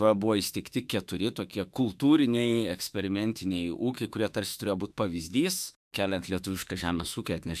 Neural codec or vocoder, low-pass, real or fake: autoencoder, 48 kHz, 32 numbers a frame, DAC-VAE, trained on Japanese speech; 14.4 kHz; fake